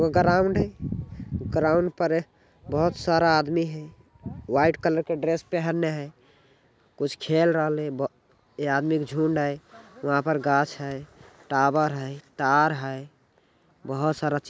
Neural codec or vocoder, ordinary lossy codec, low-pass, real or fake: none; none; none; real